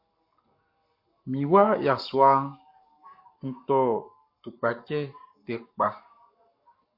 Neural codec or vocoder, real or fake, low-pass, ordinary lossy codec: codec, 44.1 kHz, 7.8 kbps, Pupu-Codec; fake; 5.4 kHz; MP3, 32 kbps